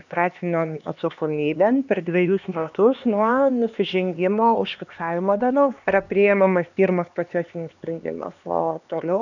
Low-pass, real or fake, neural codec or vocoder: 7.2 kHz; fake; codec, 16 kHz, 2 kbps, X-Codec, HuBERT features, trained on LibriSpeech